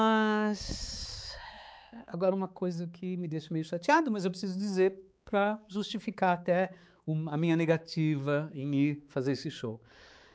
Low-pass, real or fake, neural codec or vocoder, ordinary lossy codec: none; fake; codec, 16 kHz, 4 kbps, X-Codec, HuBERT features, trained on balanced general audio; none